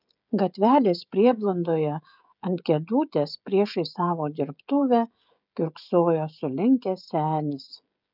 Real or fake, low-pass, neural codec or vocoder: fake; 5.4 kHz; codec, 16 kHz, 16 kbps, FreqCodec, smaller model